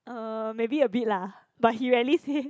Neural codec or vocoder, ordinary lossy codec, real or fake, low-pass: none; none; real; none